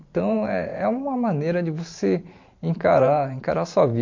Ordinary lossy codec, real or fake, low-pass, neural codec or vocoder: MP3, 48 kbps; fake; 7.2 kHz; vocoder, 44.1 kHz, 128 mel bands every 512 samples, BigVGAN v2